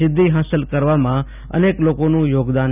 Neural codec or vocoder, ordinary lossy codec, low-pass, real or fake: none; none; 3.6 kHz; real